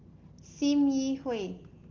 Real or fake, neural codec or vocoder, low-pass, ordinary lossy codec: real; none; 7.2 kHz; Opus, 16 kbps